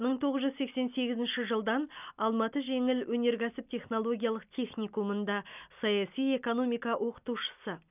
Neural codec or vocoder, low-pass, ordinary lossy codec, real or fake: none; 3.6 kHz; none; real